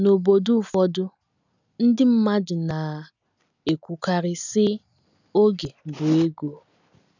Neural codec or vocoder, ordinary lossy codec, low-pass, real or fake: none; none; 7.2 kHz; real